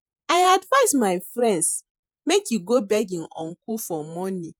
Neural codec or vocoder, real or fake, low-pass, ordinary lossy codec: vocoder, 48 kHz, 128 mel bands, Vocos; fake; none; none